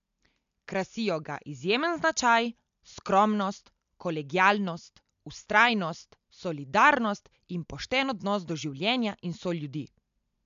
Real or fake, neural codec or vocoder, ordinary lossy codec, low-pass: real; none; MP3, 64 kbps; 7.2 kHz